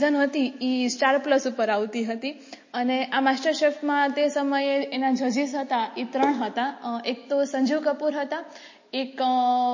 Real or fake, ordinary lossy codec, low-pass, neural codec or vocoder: real; MP3, 32 kbps; 7.2 kHz; none